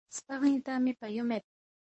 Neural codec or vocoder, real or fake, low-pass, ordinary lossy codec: codec, 24 kHz, 0.9 kbps, WavTokenizer, medium speech release version 1; fake; 9.9 kHz; MP3, 32 kbps